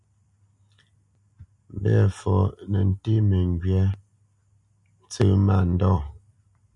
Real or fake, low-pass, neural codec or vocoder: real; 10.8 kHz; none